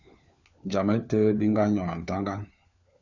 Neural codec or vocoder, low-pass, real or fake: codec, 16 kHz, 4 kbps, FunCodec, trained on LibriTTS, 50 frames a second; 7.2 kHz; fake